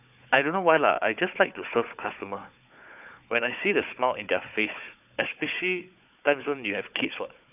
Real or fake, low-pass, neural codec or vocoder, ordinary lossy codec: fake; 3.6 kHz; codec, 16 kHz, 4 kbps, FunCodec, trained on Chinese and English, 50 frames a second; none